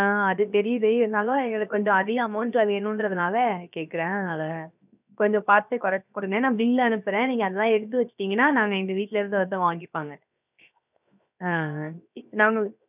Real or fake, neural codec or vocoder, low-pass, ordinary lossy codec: fake; codec, 16 kHz, 0.7 kbps, FocalCodec; 3.6 kHz; none